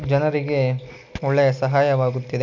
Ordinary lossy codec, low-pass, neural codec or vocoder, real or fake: MP3, 64 kbps; 7.2 kHz; none; real